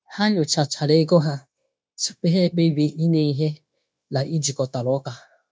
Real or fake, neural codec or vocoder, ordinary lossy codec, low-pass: fake; codec, 16 kHz, 0.9 kbps, LongCat-Audio-Codec; none; none